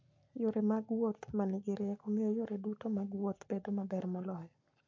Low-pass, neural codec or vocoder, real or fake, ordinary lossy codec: 7.2 kHz; codec, 44.1 kHz, 7.8 kbps, Pupu-Codec; fake; MP3, 48 kbps